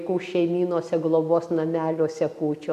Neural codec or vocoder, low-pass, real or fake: none; 14.4 kHz; real